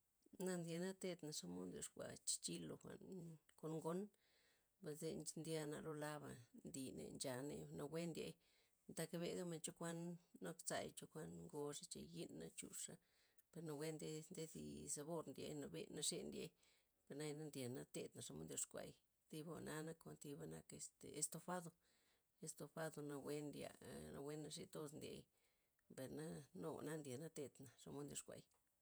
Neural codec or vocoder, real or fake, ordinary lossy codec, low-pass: vocoder, 48 kHz, 128 mel bands, Vocos; fake; none; none